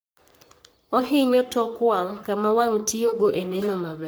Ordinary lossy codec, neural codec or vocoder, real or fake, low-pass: none; codec, 44.1 kHz, 3.4 kbps, Pupu-Codec; fake; none